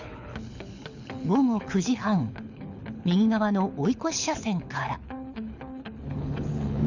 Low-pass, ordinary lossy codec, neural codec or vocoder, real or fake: 7.2 kHz; none; codec, 24 kHz, 6 kbps, HILCodec; fake